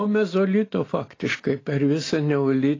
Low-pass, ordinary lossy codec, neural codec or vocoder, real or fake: 7.2 kHz; AAC, 32 kbps; none; real